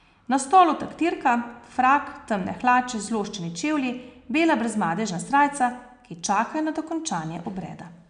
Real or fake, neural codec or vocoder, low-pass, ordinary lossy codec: real; none; 9.9 kHz; none